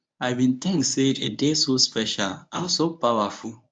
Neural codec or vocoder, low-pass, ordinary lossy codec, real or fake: codec, 24 kHz, 0.9 kbps, WavTokenizer, medium speech release version 1; 9.9 kHz; none; fake